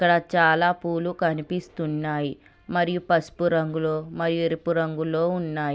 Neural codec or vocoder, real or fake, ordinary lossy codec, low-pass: none; real; none; none